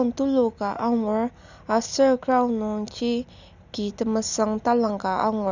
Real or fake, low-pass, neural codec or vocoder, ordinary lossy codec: real; 7.2 kHz; none; none